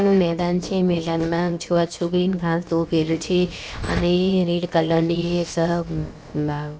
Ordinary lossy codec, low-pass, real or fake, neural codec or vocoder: none; none; fake; codec, 16 kHz, about 1 kbps, DyCAST, with the encoder's durations